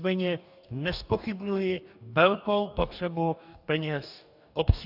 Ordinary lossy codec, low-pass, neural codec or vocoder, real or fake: AAC, 48 kbps; 5.4 kHz; codec, 44.1 kHz, 2.6 kbps, DAC; fake